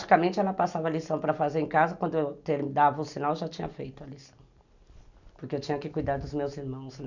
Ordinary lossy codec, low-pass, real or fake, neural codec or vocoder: none; 7.2 kHz; real; none